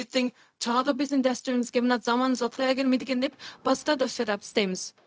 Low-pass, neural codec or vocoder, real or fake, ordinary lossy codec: none; codec, 16 kHz, 0.4 kbps, LongCat-Audio-Codec; fake; none